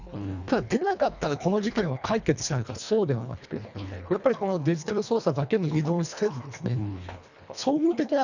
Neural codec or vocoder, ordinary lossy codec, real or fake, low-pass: codec, 24 kHz, 1.5 kbps, HILCodec; none; fake; 7.2 kHz